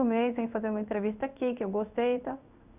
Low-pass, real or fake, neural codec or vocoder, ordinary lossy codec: 3.6 kHz; fake; codec, 16 kHz in and 24 kHz out, 1 kbps, XY-Tokenizer; none